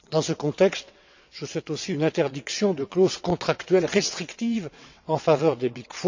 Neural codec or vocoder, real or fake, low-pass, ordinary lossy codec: vocoder, 22.05 kHz, 80 mel bands, WaveNeXt; fake; 7.2 kHz; MP3, 64 kbps